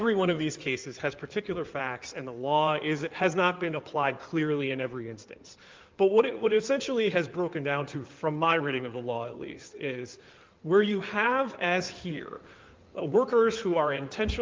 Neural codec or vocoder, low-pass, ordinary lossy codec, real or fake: codec, 16 kHz in and 24 kHz out, 2.2 kbps, FireRedTTS-2 codec; 7.2 kHz; Opus, 32 kbps; fake